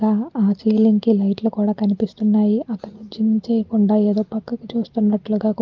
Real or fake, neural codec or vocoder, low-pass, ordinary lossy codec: real; none; 7.2 kHz; Opus, 32 kbps